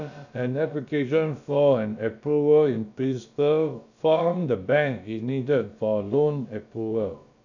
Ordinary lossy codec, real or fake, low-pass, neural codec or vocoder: none; fake; 7.2 kHz; codec, 16 kHz, about 1 kbps, DyCAST, with the encoder's durations